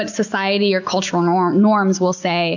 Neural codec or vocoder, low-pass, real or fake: none; 7.2 kHz; real